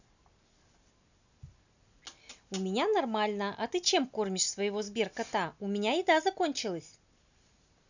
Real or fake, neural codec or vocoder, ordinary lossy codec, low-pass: real; none; none; 7.2 kHz